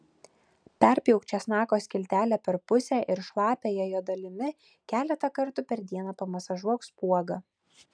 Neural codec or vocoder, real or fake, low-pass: none; real; 9.9 kHz